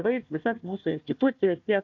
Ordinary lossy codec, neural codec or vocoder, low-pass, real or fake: MP3, 64 kbps; codec, 16 kHz, 1 kbps, FunCodec, trained on Chinese and English, 50 frames a second; 7.2 kHz; fake